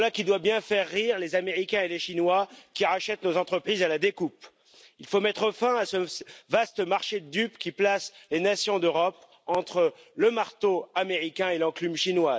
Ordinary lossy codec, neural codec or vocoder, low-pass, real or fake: none; none; none; real